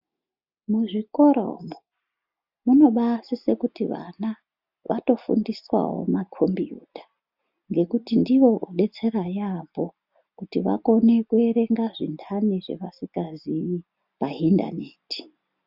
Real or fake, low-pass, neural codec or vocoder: real; 5.4 kHz; none